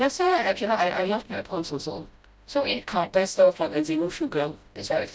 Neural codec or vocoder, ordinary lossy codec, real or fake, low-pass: codec, 16 kHz, 0.5 kbps, FreqCodec, smaller model; none; fake; none